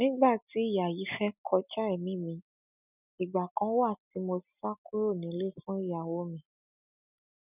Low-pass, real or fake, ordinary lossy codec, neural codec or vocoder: 3.6 kHz; real; none; none